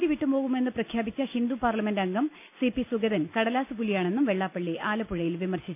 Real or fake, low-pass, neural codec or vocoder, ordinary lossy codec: real; 3.6 kHz; none; none